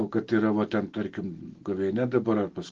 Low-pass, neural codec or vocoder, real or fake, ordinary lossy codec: 7.2 kHz; none; real; Opus, 16 kbps